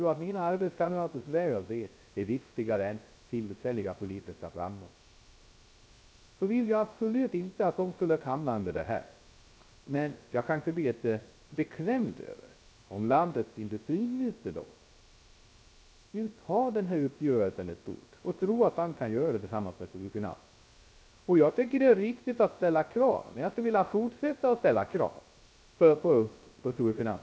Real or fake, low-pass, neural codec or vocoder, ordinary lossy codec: fake; none; codec, 16 kHz, 0.3 kbps, FocalCodec; none